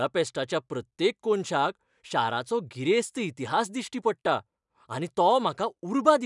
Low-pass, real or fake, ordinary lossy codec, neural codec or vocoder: 10.8 kHz; real; none; none